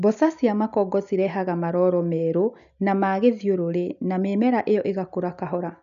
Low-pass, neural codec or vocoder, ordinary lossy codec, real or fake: 7.2 kHz; none; none; real